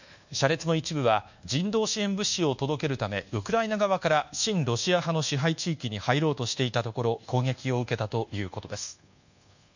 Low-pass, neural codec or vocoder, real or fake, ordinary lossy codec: 7.2 kHz; codec, 24 kHz, 1.2 kbps, DualCodec; fake; none